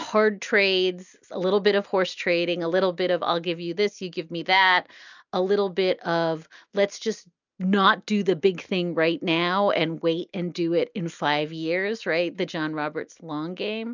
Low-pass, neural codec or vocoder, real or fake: 7.2 kHz; none; real